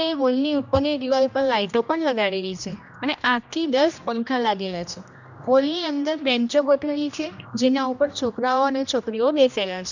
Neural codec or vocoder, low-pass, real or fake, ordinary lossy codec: codec, 16 kHz, 1 kbps, X-Codec, HuBERT features, trained on general audio; 7.2 kHz; fake; none